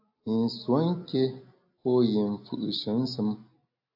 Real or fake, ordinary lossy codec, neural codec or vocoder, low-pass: real; AAC, 48 kbps; none; 5.4 kHz